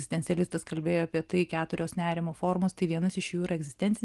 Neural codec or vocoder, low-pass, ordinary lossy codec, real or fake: none; 10.8 kHz; Opus, 24 kbps; real